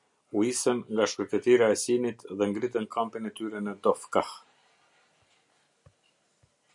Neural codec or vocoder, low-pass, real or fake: none; 10.8 kHz; real